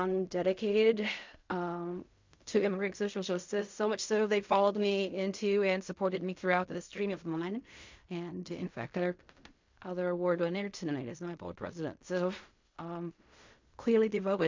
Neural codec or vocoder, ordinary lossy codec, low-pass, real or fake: codec, 16 kHz in and 24 kHz out, 0.4 kbps, LongCat-Audio-Codec, fine tuned four codebook decoder; MP3, 48 kbps; 7.2 kHz; fake